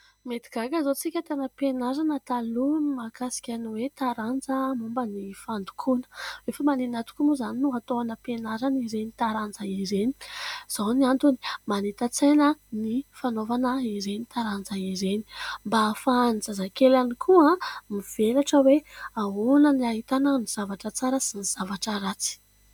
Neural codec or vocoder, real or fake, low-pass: none; real; 19.8 kHz